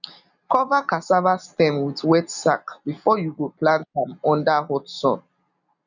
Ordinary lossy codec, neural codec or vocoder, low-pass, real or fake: none; none; 7.2 kHz; real